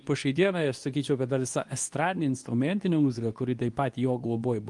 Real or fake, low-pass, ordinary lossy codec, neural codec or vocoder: fake; 10.8 kHz; Opus, 32 kbps; codec, 24 kHz, 0.9 kbps, WavTokenizer, medium speech release version 2